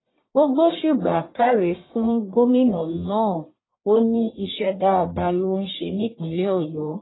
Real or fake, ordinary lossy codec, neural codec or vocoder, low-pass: fake; AAC, 16 kbps; codec, 44.1 kHz, 1.7 kbps, Pupu-Codec; 7.2 kHz